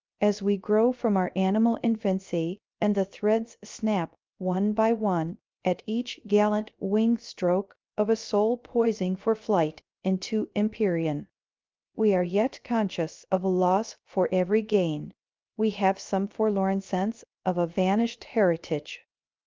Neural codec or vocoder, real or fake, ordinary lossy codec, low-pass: codec, 16 kHz, 0.3 kbps, FocalCodec; fake; Opus, 24 kbps; 7.2 kHz